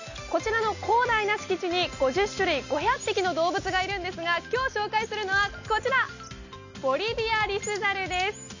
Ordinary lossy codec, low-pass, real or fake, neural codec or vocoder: none; 7.2 kHz; real; none